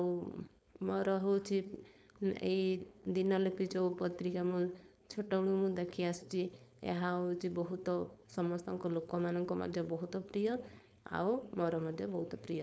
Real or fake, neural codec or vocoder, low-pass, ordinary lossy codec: fake; codec, 16 kHz, 4.8 kbps, FACodec; none; none